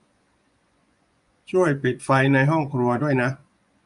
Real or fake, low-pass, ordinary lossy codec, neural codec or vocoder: fake; 10.8 kHz; none; vocoder, 24 kHz, 100 mel bands, Vocos